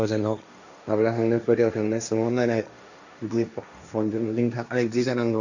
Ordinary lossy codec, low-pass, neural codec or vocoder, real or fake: none; 7.2 kHz; codec, 16 kHz, 1.1 kbps, Voila-Tokenizer; fake